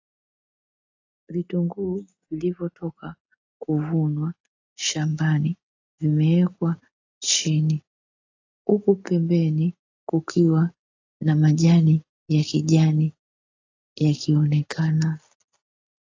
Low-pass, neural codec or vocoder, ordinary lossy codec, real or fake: 7.2 kHz; none; AAC, 48 kbps; real